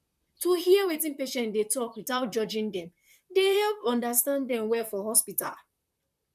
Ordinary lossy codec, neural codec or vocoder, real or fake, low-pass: none; vocoder, 44.1 kHz, 128 mel bands, Pupu-Vocoder; fake; 14.4 kHz